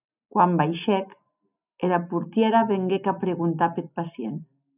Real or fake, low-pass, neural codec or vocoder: real; 3.6 kHz; none